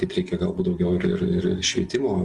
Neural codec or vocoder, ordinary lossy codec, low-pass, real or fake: none; Opus, 32 kbps; 10.8 kHz; real